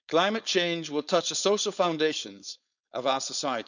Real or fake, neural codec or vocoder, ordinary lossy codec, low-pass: fake; codec, 16 kHz, 4.8 kbps, FACodec; none; 7.2 kHz